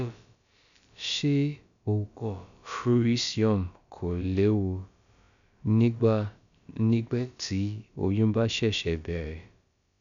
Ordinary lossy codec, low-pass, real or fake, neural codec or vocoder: none; 7.2 kHz; fake; codec, 16 kHz, about 1 kbps, DyCAST, with the encoder's durations